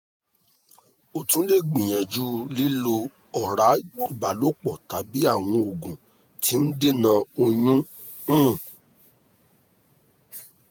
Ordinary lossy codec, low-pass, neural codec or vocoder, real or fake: none; none; vocoder, 48 kHz, 128 mel bands, Vocos; fake